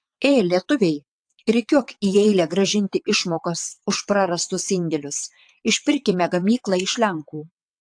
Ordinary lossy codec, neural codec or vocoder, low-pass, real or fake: AAC, 64 kbps; vocoder, 22.05 kHz, 80 mel bands, WaveNeXt; 9.9 kHz; fake